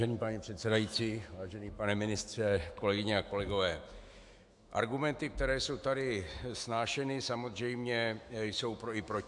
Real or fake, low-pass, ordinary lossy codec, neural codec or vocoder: fake; 10.8 kHz; AAC, 64 kbps; autoencoder, 48 kHz, 128 numbers a frame, DAC-VAE, trained on Japanese speech